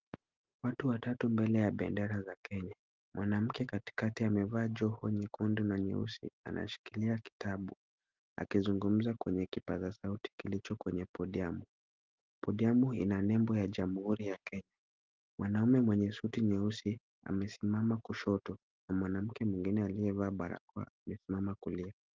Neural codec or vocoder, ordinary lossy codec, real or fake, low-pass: none; Opus, 32 kbps; real; 7.2 kHz